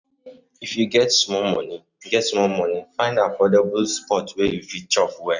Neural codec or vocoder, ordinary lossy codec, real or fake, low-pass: none; none; real; 7.2 kHz